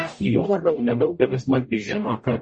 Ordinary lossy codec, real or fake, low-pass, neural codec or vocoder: MP3, 32 kbps; fake; 10.8 kHz; codec, 44.1 kHz, 0.9 kbps, DAC